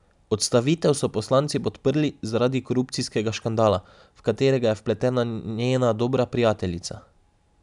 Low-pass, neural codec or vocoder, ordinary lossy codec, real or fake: 10.8 kHz; none; none; real